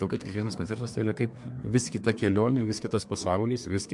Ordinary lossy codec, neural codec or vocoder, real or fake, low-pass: MP3, 64 kbps; codec, 24 kHz, 1 kbps, SNAC; fake; 10.8 kHz